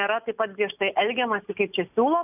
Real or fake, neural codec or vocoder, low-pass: real; none; 3.6 kHz